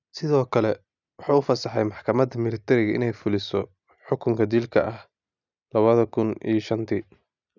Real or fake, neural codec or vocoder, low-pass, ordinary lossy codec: real; none; 7.2 kHz; none